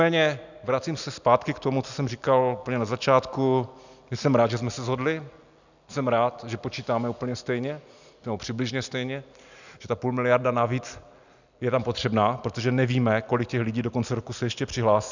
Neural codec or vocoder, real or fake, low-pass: none; real; 7.2 kHz